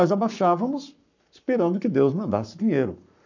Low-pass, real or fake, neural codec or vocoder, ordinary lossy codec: 7.2 kHz; fake; codec, 44.1 kHz, 7.8 kbps, Pupu-Codec; none